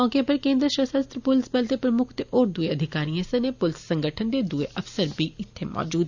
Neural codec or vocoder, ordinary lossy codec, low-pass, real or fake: none; none; 7.2 kHz; real